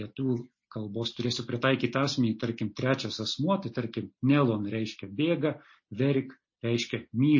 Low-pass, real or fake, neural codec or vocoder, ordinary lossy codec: 7.2 kHz; real; none; MP3, 32 kbps